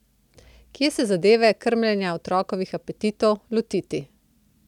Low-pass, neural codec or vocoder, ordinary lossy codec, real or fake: 19.8 kHz; none; none; real